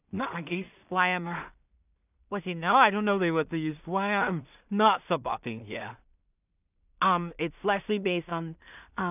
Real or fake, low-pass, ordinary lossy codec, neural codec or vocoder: fake; 3.6 kHz; none; codec, 16 kHz in and 24 kHz out, 0.4 kbps, LongCat-Audio-Codec, two codebook decoder